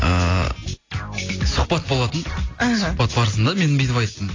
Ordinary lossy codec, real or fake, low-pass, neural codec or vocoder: AAC, 32 kbps; real; 7.2 kHz; none